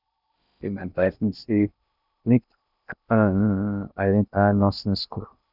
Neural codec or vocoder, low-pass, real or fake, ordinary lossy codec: codec, 16 kHz in and 24 kHz out, 0.6 kbps, FocalCodec, streaming, 2048 codes; 5.4 kHz; fake; none